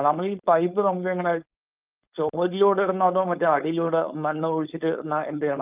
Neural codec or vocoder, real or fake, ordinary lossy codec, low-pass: codec, 16 kHz, 4.8 kbps, FACodec; fake; Opus, 24 kbps; 3.6 kHz